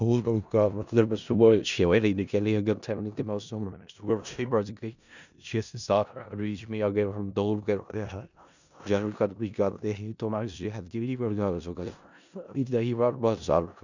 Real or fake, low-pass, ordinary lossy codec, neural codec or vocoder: fake; 7.2 kHz; none; codec, 16 kHz in and 24 kHz out, 0.4 kbps, LongCat-Audio-Codec, four codebook decoder